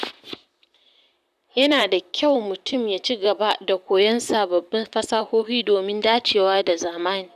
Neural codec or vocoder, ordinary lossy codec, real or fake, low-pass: vocoder, 44.1 kHz, 128 mel bands, Pupu-Vocoder; none; fake; 14.4 kHz